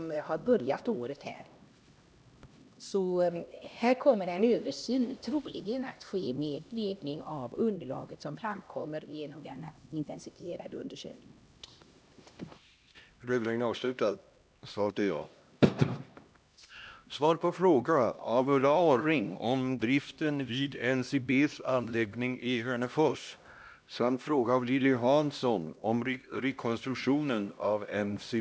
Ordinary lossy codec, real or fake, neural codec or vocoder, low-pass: none; fake; codec, 16 kHz, 1 kbps, X-Codec, HuBERT features, trained on LibriSpeech; none